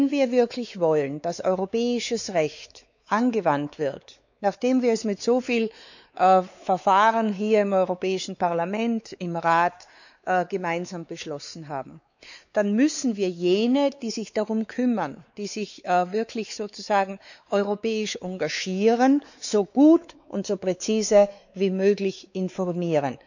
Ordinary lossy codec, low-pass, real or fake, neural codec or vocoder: none; 7.2 kHz; fake; codec, 16 kHz, 4 kbps, X-Codec, WavLM features, trained on Multilingual LibriSpeech